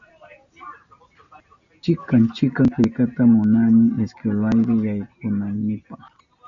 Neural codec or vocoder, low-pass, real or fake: none; 7.2 kHz; real